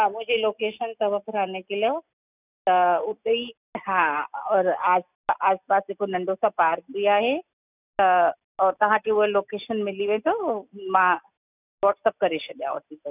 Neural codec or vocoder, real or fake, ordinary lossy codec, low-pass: none; real; none; 3.6 kHz